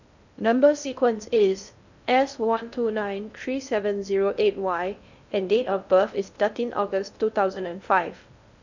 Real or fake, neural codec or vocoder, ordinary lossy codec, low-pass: fake; codec, 16 kHz in and 24 kHz out, 0.6 kbps, FocalCodec, streaming, 2048 codes; none; 7.2 kHz